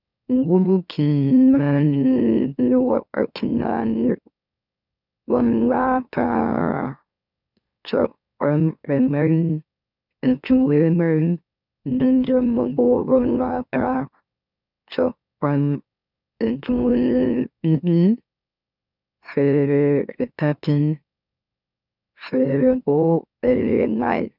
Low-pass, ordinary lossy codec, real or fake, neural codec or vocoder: 5.4 kHz; none; fake; autoencoder, 44.1 kHz, a latent of 192 numbers a frame, MeloTTS